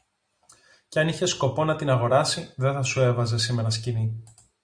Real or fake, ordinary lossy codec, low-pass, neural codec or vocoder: real; Opus, 64 kbps; 9.9 kHz; none